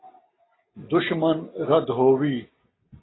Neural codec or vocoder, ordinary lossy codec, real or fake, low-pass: none; AAC, 16 kbps; real; 7.2 kHz